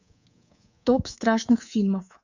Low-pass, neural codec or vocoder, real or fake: 7.2 kHz; codec, 24 kHz, 3.1 kbps, DualCodec; fake